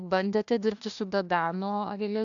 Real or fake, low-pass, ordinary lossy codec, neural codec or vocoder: fake; 7.2 kHz; AAC, 64 kbps; codec, 16 kHz, 1 kbps, FunCodec, trained on Chinese and English, 50 frames a second